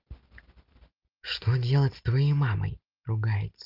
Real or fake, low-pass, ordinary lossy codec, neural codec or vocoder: real; 5.4 kHz; Opus, 32 kbps; none